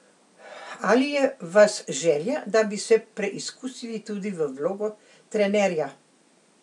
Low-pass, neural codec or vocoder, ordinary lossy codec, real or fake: 10.8 kHz; none; none; real